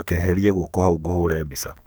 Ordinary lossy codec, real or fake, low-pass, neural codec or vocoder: none; fake; none; codec, 44.1 kHz, 2.6 kbps, SNAC